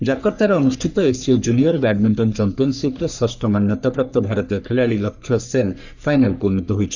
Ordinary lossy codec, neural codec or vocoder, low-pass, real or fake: none; codec, 44.1 kHz, 3.4 kbps, Pupu-Codec; 7.2 kHz; fake